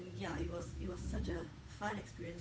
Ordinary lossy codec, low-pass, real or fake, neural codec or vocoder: none; none; fake; codec, 16 kHz, 8 kbps, FunCodec, trained on Chinese and English, 25 frames a second